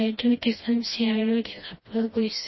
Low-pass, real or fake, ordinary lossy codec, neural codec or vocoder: 7.2 kHz; fake; MP3, 24 kbps; codec, 16 kHz, 1 kbps, FreqCodec, smaller model